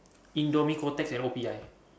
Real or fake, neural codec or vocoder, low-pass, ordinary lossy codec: real; none; none; none